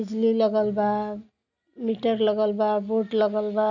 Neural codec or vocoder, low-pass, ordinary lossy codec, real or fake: none; 7.2 kHz; none; real